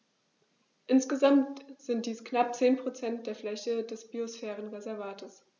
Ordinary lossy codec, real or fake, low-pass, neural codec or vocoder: none; real; 7.2 kHz; none